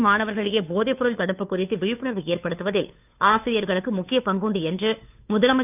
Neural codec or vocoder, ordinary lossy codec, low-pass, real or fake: codec, 16 kHz, 2 kbps, FunCodec, trained on Chinese and English, 25 frames a second; none; 3.6 kHz; fake